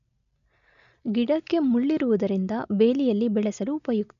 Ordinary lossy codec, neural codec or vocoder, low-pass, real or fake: none; none; 7.2 kHz; real